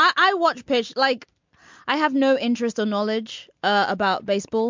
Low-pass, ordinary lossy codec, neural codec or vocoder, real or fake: 7.2 kHz; MP3, 64 kbps; none; real